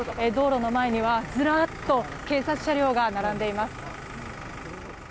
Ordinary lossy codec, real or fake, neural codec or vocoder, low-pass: none; real; none; none